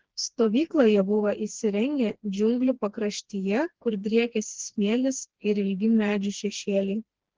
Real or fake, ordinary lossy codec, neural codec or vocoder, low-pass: fake; Opus, 16 kbps; codec, 16 kHz, 2 kbps, FreqCodec, smaller model; 7.2 kHz